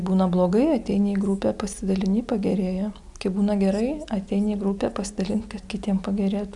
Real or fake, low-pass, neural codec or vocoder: real; 10.8 kHz; none